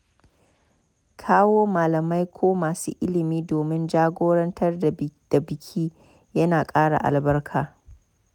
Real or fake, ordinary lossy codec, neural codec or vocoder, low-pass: real; none; none; 19.8 kHz